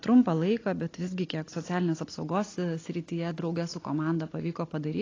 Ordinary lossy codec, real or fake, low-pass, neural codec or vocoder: AAC, 32 kbps; real; 7.2 kHz; none